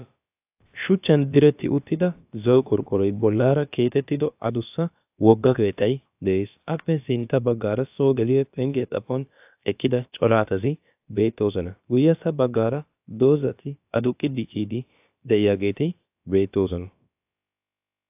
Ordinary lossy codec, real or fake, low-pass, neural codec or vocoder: AAC, 32 kbps; fake; 3.6 kHz; codec, 16 kHz, about 1 kbps, DyCAST, with the encoder's durations